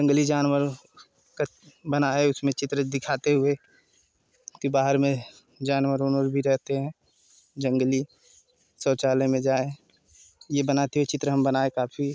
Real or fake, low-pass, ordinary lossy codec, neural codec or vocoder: real; none; none; none